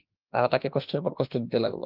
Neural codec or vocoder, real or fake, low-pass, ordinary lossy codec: autoencoder, 48 kHz, 32 numbers a frame, DAC-VAE, trained on Japanese speech; fake; 5.4 kHz; Opus, 32 kbps